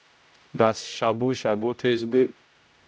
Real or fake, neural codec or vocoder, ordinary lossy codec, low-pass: fake; codec, 16 kHz, 0.5 kbps, X-Codec, HuBERT features, trained on general audio; none; none